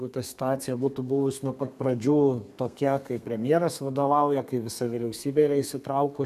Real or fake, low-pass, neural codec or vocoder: fake; 14.4 kHz; codec, 32 kHz, 1.9 kbps, SNAC